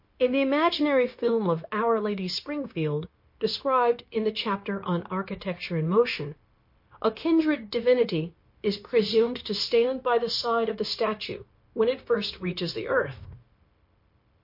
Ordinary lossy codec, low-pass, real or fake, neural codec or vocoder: MP3, 32 kbps; 5.4 kHz; fake; codec, 16 kHz, 0.9 kbps, LongCat-Audio-Codec